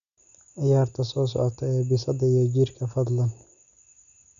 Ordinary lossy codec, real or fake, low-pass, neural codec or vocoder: none; real; 7.2 kHz; none